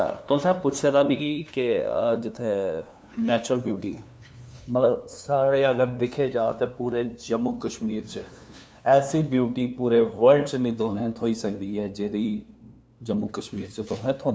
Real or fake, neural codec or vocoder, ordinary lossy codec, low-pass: fake; codec, 16 kHz, 2 kbps, FunCodec, trained on LibriTTS, 25 frames a second; none; none